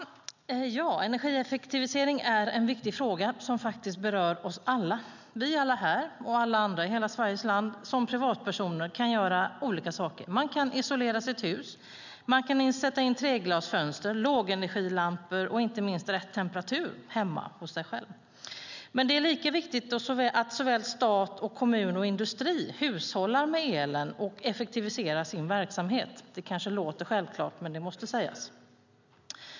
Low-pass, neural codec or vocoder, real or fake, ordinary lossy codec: 7.2 kHz; none; real; none